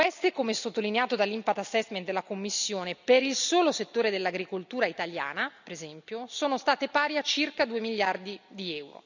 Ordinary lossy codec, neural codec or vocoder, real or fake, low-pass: none; none; real; 7.2 kHz